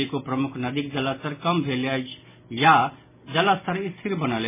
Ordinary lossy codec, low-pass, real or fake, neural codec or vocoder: MP3, 16 kbps; 3.6 kHz; real; none